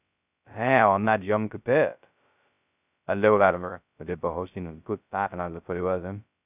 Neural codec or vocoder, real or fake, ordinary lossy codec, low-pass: codec, 16 kHz, 0.2 kbps, FocalCodec; fake; none; 3.6 kHz